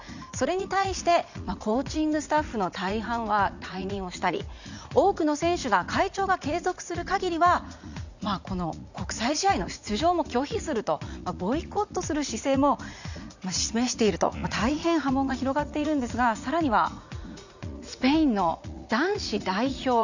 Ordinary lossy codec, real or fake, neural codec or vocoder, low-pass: none; fake; vocoder, 44.1 kHz, 80 mel bands, Vocos; 7.2 kHz